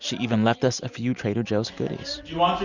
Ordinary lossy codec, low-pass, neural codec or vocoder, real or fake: Opus, 64 kbps; 7.2 kHz; none; real